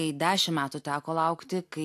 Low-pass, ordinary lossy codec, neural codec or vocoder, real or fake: 14.4 kHz; AAC, 64 kbps; none; real